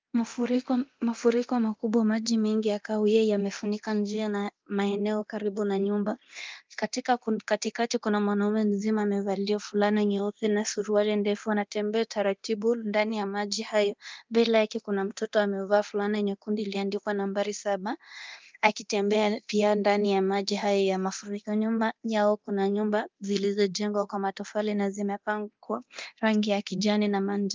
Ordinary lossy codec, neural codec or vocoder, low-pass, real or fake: Opus, 24 kbps; codec, 24 kHz, 0.9 kbps, DualCodec; 7.2 kHz; fake